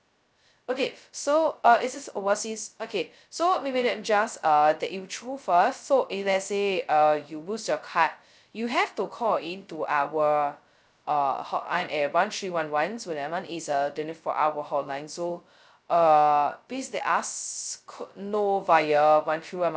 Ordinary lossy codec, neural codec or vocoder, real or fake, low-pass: none; codec, 16 kHz, 0.2 kbps, FocalCodec; fake; none